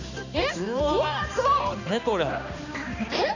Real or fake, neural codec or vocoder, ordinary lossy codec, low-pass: fake; codec, 16 kHz, 2 kbps, X-Codec, HuBERT features, trained on balanced general audio; none; 7.2 kHz